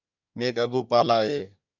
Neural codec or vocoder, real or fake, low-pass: codec, 24 kHz, 1 kbps, SNAC; fake; 7.2 kHz